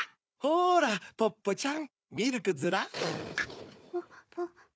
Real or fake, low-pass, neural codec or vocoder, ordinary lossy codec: fake; none; codec, 16 kHz, 4 kbps, FunCodec, trained on Chinese and English, 50 frames a second; none